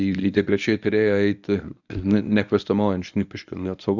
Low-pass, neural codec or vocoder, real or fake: 7.2 kHz; codec, 24 kHz, 0.9 kbps, WavTokenizer, medium speech release version 1; fake